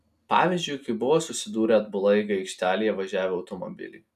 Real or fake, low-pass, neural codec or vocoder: real; 14.4 kHz; none